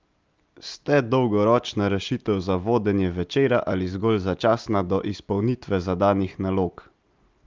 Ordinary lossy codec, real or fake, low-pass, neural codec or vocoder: Opus, 24 kbps; real; 7.2 kHz; none